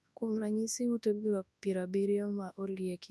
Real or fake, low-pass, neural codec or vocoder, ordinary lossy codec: fake; none; codec, 24 kHz, 0.9 kbps, WavTokenizer, large speech release; none